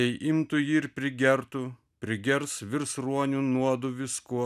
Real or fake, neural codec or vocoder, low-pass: real; none; 14.4 kHz